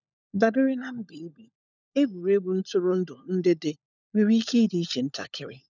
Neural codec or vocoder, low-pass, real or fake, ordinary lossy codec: codec, 16 kHz, 16 kbps, FunCodec, trained on LibriTTS, 50 frames a second; 7.2 kHz; fake; none